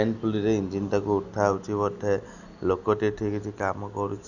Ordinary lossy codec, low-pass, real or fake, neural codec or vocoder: none; 7.2 kHz; real; none